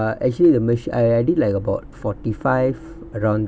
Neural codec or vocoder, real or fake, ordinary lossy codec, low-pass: none; real; none; none